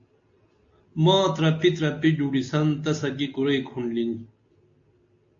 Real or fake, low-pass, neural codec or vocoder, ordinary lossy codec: real; 7.2 kHz; none; AAC, 48 kbps